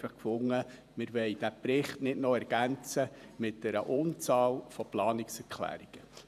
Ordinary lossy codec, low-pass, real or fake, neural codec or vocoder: none; 14.4 kHz; fake; vocoder, 48 kHz, 128 mel bands, Vocos